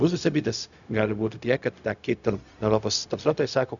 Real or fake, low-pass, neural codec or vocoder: fake; 7.2 kHz; codec, 16 kHz, 0.4 kbps, LongCat-Audio-Codec